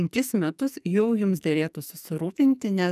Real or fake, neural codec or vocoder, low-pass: fake; codec, 44.1 kHz, 2.6 kbps, SNAC; 14.4 kHz